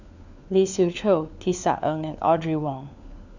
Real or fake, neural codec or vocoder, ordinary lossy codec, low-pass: fake; codec, 16 kHz, 4 kbps, FunCodec, trained on LibriTTS, 50 frames a second; none; 7.2 kHz